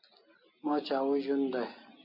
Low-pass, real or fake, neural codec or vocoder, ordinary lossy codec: 5.4 kHz; real; none; MP3, 48 kbps